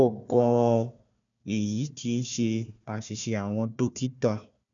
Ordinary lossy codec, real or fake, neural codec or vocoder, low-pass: none; fake; codec, 16 kHz, 1 kbps, FunCodec, trained on Chinese and English, 50 frames a second; 7.2 kHz